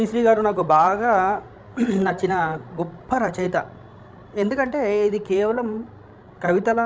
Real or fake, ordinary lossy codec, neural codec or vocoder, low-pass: fake; none; codec, 16 kHz, 16 kbps, FreqCodec, larger model; none